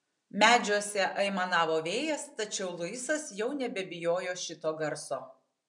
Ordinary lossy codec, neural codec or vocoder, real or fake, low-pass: MP3, 96 kbps; none; real; 10.8 kHz